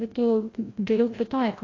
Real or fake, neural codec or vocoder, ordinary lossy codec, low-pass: fake; codec, 16 kHz, 0.5 kbps, FreqCodec, larger model; AAC, 32 kbps; 7.2 kHz